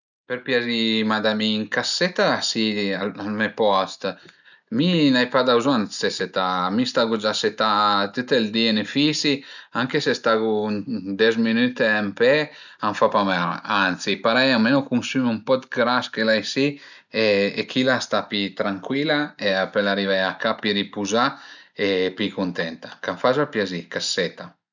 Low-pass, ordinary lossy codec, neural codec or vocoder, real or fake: 7.2 kHz; none; none; real